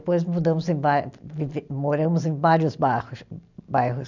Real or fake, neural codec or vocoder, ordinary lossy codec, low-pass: real; none; none; 7.2 kHz